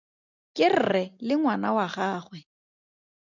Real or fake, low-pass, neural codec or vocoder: real; 7.2 kHz; none